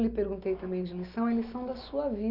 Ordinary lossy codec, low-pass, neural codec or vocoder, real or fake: none; 5.4 kHz; none; real